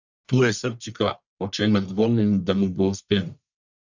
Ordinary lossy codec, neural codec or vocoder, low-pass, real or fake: none; codec, 44.1 kHz, 1.7 kbps, Pupu-Codec; 7.2 kHz; fake